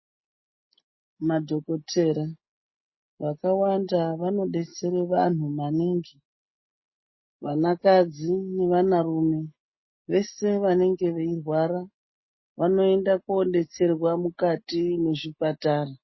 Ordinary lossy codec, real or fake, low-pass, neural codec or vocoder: MP3, 24 kbps; real; 7.2 kHz; none